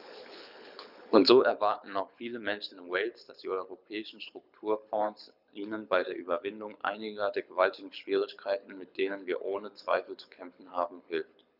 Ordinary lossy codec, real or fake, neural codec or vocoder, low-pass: none; fake; codec, 24 kHz, 6 kbps, HILCodec; 5.4 kHz